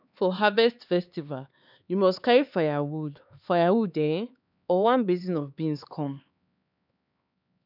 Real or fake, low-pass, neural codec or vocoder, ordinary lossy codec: fake; 5.4 kHz; codec, 16 kHz, 4 kbps, X-Codec, HuBERT features, trained on LibriSpeech; none